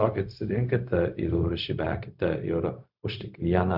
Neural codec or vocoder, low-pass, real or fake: codec, 16 kHz, 0.4 kbps, LongCat-Audio-Codec; 5.4 kHz; fake